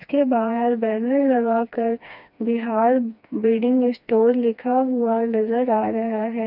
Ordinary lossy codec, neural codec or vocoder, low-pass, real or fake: none; codec, 16 kHz, 2 kbps, FreqCodec, smaller model; 5.4 kHz; fake